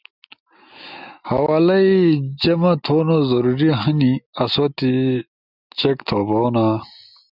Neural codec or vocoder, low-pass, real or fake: none; 5.4 kHz; real